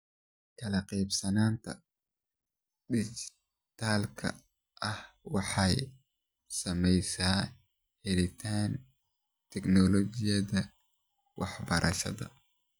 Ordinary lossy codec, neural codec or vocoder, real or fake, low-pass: none; none; real; none